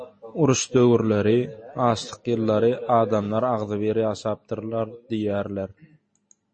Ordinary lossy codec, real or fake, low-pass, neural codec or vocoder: MP3, 32 kbps; real; 9.9 kHz; none